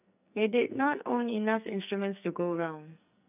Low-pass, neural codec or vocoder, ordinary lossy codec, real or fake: 3.6 kHz; codec, 44.1 kHz, 2.6 kbps, SNAC; none; fake